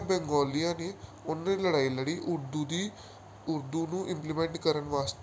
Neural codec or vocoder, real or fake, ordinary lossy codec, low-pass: none; real; none; none